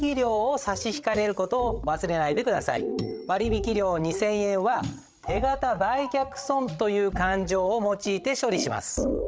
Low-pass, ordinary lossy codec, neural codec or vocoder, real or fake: none; none; codec, 16 kHz, 8 kbps, FreqCodec, larger model; fake